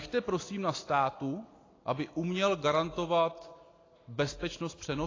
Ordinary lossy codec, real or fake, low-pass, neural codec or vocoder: AAC, 32 kbps; real; 7.2 kHz; none